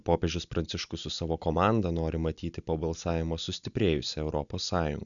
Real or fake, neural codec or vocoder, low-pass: real; none; 7.2 kHz